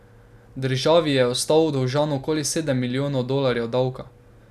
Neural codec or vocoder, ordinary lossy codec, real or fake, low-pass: none; none; real; 14.4 kHz